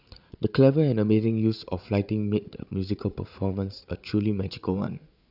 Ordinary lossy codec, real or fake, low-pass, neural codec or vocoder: none; fake; 5.4 kHz; codec, 16 kHz, 8 kbps, FreqCodec, larger model